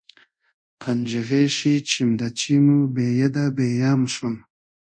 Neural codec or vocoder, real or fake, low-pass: codec, 24 kHz, 0.5 kbps, DualCodec; fake; 9.9 kHz